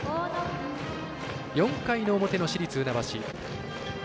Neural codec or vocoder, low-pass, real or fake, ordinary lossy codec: none; none; real; none